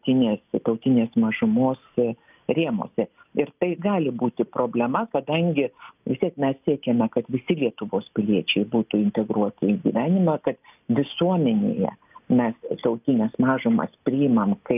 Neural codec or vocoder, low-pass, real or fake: none; 3.6 kHz; real